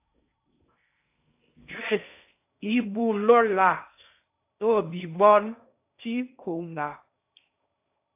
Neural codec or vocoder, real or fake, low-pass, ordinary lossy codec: codec, 16 kHz in and 24 kHz out, 0.6 kbps, FocalCodec, streaming, 4096 codes; fake; 3.6 kHz; AAC, 32 kbps